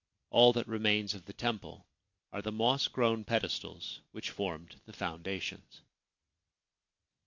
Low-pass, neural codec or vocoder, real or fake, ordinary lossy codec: 7.2 kHz; none; real; MP3, 48 kbps